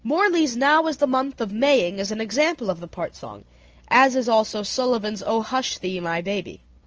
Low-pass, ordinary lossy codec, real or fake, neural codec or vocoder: 7.2 kHz; Opus, 24 kbps; real; none